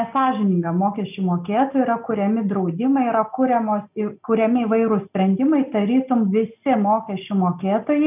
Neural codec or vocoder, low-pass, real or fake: none; 3.6 kHz; real